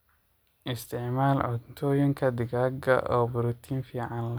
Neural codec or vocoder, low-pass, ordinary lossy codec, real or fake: none; none; none; real